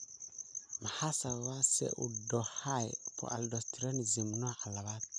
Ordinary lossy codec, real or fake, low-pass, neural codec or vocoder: none; real; 9.9 kHz; none